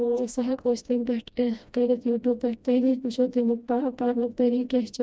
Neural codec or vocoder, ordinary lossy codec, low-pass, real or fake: codec, 16 kHz, 1 kbps, FreqCodec, smaller model; none; none; fake